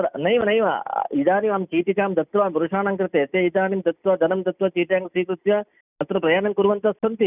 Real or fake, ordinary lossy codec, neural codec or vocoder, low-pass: real; none; none; 3.6 kHz